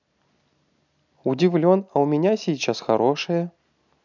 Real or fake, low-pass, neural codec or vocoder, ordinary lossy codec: real; 7.2 kHz; none; none